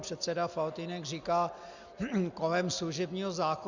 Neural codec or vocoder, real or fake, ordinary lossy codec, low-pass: none; real; Opus, 64 kbps; 7.2 kHz